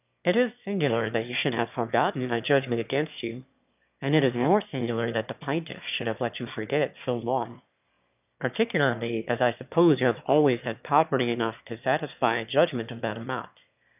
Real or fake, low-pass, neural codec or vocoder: fake; 3.6 kHz; autoencoder, 22.05 kHz, a latent of 192 numbers a frame, VITS, trained on one speaker